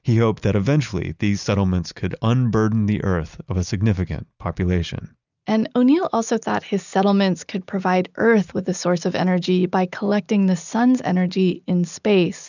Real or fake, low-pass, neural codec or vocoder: real; 7.2 kHz; none